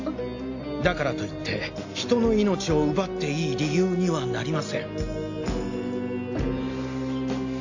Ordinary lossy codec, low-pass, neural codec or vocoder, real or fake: AAC, 48 kbps; 7.2 kHz; none; real